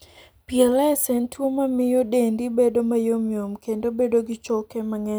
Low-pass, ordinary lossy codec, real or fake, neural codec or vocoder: none; none; real; none